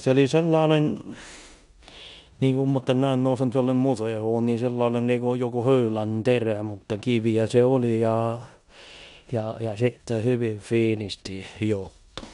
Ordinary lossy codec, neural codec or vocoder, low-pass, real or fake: none; codec, 16 kHz in and 24 kHz out, 0.9 kbps, LongCat-Audio-Codec, four codebook decoder; 10.8 kHz; fake